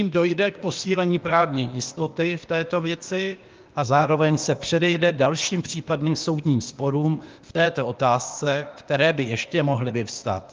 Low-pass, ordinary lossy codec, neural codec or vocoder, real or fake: 7.2 kHz; Opus, 24 kbps; codec, 16 kHz, 0.8 kbps, ZipCodec; fake